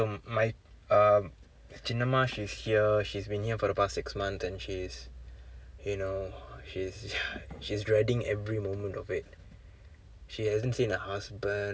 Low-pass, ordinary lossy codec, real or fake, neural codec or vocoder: none; none; real; none